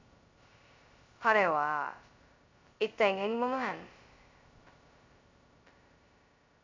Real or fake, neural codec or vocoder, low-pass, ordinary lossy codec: fake; codec, 16 kHz, 0.2 kbps, FocalCodec; 7.2 kHz; MP3, 64 kbps